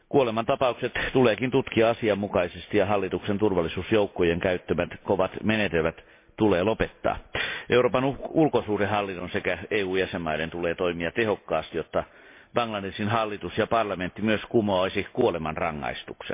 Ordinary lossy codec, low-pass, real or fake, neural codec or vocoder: MP3, 24 kbps; 3.6 kHz; real; none